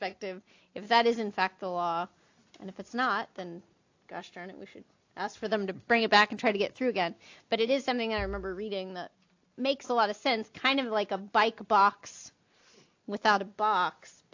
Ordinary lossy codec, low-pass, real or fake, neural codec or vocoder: AAC, 48 kbps; 7.2 kHz; real; none